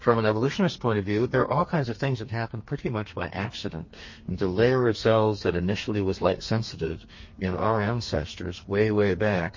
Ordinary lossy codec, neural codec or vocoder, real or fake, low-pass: MP3, 32 kbps; codec, 32 kHz, 1.9 kbps, SNAC; fake; 7.2 kHz